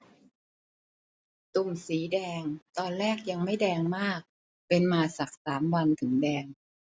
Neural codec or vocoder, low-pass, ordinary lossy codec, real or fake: none; none; none; real